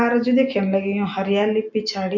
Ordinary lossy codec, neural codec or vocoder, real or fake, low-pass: MP3, 64 kbps; none; real; 7.2 kHz